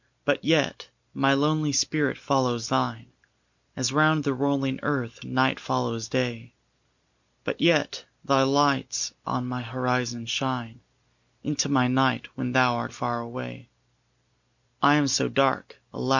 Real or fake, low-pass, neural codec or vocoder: real; 7.2 kHz; none